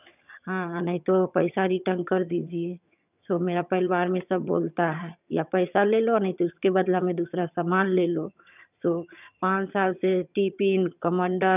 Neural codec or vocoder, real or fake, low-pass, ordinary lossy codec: vocoder, 22.05 kHz, 80 mel bands, HiFi-GAN; fake; 3.6 kHz; none